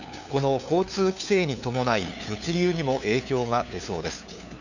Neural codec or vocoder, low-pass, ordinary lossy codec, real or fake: codec, 16 kHz, 2 kbps, FunCodec, trained on LibriTTS, 25 frames a second; 7.2 kHz; none; fake